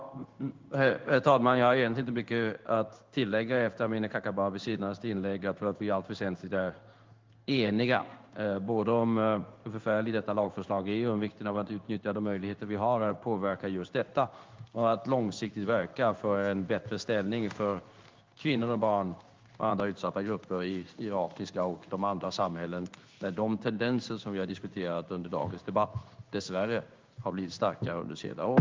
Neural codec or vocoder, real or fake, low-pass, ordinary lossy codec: codec, 16 kHz in and 24 kHz out, 1 kbps, XY-Tokenizer; fake; 7.2 kHz; Opus, 32 kbps